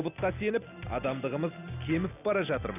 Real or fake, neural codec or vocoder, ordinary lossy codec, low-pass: real; none; none; 3.6 kHz